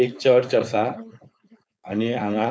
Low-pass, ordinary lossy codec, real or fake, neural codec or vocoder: none; none; fake; codec, 16 kHz, 4.8 kbps, FACodec